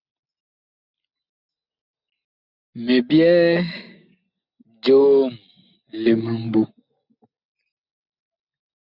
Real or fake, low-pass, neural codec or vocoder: real; 5.4 kHz; none